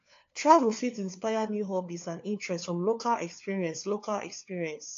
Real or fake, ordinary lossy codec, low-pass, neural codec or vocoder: fake; AAC, 48 kbps; 7.2 kHz; codec, 16 kHz, 4 kbps, FunCodec, trained on LibriTTS, 50 frames a second